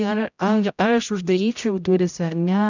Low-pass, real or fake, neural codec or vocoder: 7.2 kHz; fake; codec, 16 kHz, 0.5 kbps, X-Codec, HuBERT features, trained on general audio